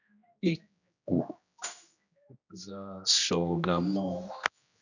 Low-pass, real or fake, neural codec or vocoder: 7.2 kHz; fake; codec, 16 kHz, 1 kbps, X-Codec, HuBERT features, trained on general audio